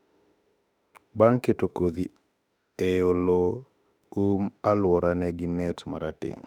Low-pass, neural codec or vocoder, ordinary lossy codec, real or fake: 19.8 kHz; autoencoder, 48 kHz, 32 numbers a frame, DAC-VAE, trained on Japanese speech; none; fake